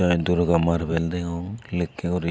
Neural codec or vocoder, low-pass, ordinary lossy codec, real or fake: none; none; none; real